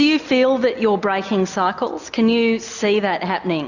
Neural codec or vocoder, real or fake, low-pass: none; real; 7.2 kHz